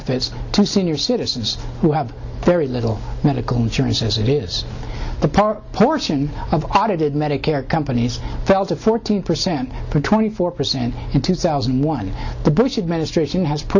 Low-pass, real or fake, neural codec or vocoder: 7.2 kHz; real; none